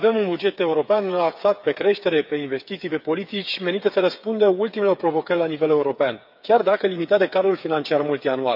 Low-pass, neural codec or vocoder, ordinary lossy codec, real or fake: 5.4 kHz; codec, 16 kHz, 8 kbps, FreqCodec, smaller model; none; fake